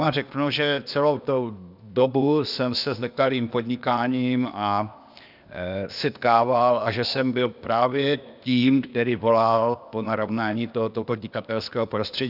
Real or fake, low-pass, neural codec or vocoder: fake; 5.4 kHz; codec, 16 kHz, 0.8 kbps, ZipCodec